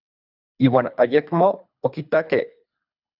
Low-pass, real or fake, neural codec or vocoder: 5.4 kHz; fake; codec, 24 kHz, 3 kbps, HILCodec